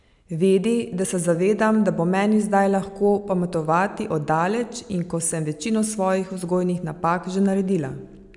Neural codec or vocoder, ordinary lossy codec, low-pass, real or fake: none; none; 10.8 kHz; real